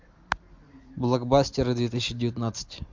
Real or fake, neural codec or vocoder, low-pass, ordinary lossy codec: real; none; 7.2 kHz; MP3, 64 kbps